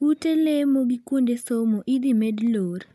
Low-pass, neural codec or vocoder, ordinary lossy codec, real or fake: 14.4 kHz; none; none; real